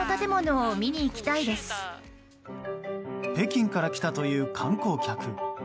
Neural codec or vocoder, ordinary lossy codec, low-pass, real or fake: none; none; none; real